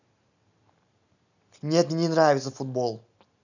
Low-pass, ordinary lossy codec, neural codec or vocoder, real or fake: 7.2 kHz; none; none; real